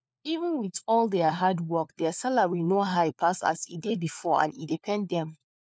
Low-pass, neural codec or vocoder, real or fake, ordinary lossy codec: none; codec, 16 kHz, 4 kbps, FunCodec, trained on LibriTTS, 50 frames a second; fake; none